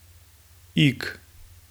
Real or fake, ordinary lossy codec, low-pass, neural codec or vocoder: real; none; none; none